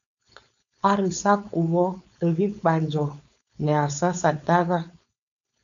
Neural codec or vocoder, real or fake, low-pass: codec, 16 kHz, 4.8 kbps, FACodec; fake; 7.2 kHz